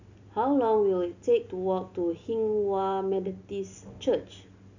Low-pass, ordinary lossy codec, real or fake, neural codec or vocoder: 7.2 kHz; none; real; none